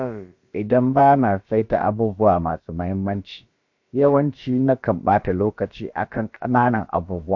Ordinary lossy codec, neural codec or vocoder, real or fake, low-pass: MP3, 48 kbps; codec, 16 kHz, about 1 kbps, DyCAST, with the encoder's durations; fake; 7.2 kHz